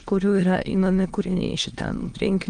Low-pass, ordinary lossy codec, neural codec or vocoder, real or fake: 9.9 kHz; Opus, 24 kbps; autoencoder, 22.05 kHz, a latent of 192 numbers a frame, VITS, trained on many speakers; fake